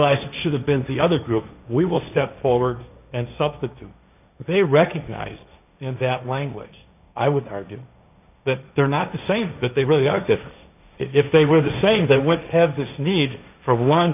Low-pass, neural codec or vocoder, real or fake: 3.6 kHz; codec, 16 kHz, 1.1 kbps, Voila-Tokenizer; fake